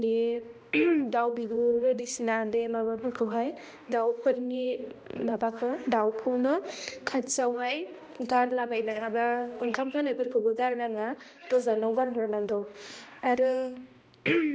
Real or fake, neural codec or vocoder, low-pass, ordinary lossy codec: fake; codec, 16 kHz, 1 kbps, X-Codec, HuBERT features, trained on balanced general audio; none; none